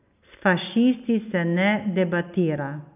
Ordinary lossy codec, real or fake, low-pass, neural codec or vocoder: none; real; 3.6 kHz; none